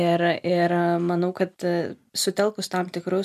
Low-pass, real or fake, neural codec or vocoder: 14.4 kHz; real; none